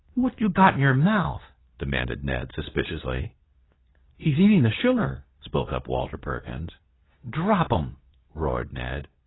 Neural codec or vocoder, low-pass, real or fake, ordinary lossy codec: codec, 24 kHz, 0.9 kbps, WavTokenizer, medium speech release version 2; 7.2 kHz; fake; AAC, 16 kbps